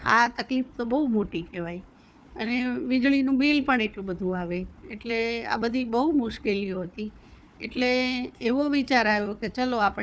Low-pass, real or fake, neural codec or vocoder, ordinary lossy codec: none; fake; codec, 16 kHz, 4 kbps, FunCodec, trained on Chinese and English, 50 frames a second; none